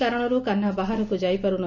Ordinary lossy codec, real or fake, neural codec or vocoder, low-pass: none; real; none; 7.2 kHz